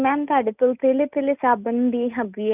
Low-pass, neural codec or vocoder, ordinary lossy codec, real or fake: 3.6 kHz; none; none; real